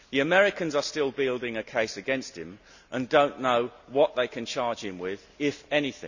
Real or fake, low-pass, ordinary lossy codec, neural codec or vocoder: real; 7.2 kHz; none; none